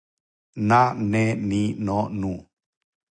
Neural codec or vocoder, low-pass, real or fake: none; 9.9 kHz; real